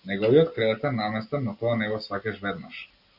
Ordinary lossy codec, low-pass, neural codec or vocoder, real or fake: Opus, 64 kbps; 5.4 kHz; none; real